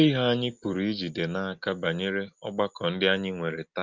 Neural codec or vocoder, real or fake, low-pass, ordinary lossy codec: none; real; 7.2 kHz; Opus, 32 kbps